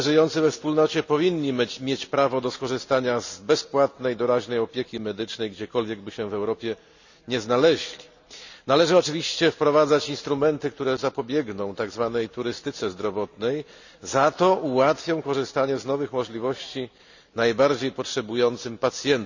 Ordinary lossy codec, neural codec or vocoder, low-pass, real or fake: none; none; 7.2 kHz; real